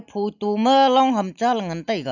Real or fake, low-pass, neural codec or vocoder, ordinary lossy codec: real; 7.2 kHz; none; none